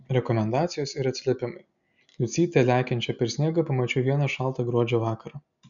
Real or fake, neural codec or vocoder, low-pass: real; none; 7.2 kHz